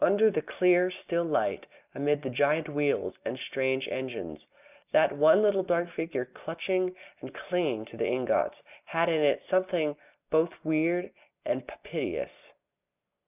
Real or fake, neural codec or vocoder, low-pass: real; none; 3.6 kHz